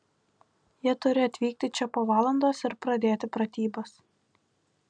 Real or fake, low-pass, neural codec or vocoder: real; 9.9 kHz; none